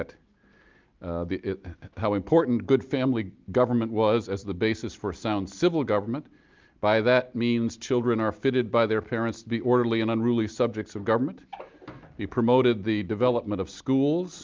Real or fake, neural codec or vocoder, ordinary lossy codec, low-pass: real; none; Opus, 24 kbps; 7.2 kHz